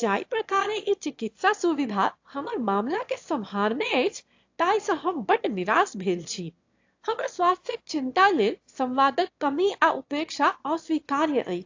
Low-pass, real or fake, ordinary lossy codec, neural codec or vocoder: 7.2 kHz; fake; AAC, 48 kbps; autoencoder, 22.05 kHz, a latent of 192 numbers a frame, VITS, trained on one speaker